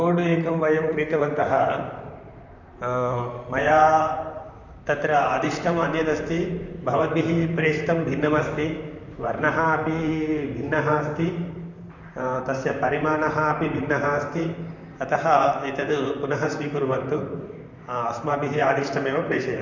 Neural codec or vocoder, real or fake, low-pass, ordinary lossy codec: vocoder, 44.1 kHz, 128 mel bands, Pupu-Vocoder; fake; 7.2 kHz; none